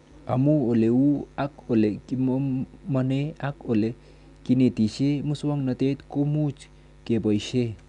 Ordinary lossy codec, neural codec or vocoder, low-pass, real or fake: none; none; 10.8 kHz; real